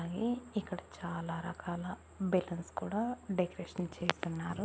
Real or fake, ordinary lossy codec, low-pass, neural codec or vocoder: real; none; none; none